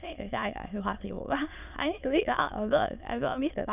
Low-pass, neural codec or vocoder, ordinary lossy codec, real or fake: 3.6 kHz; autoencoder, 22.05 kHz, a latent of 192 numbers a frame, VITS, trained on many speakers; none; fake